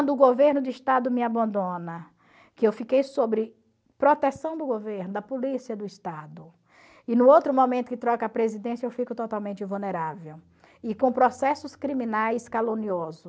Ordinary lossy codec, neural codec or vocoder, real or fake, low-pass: none; none; real; none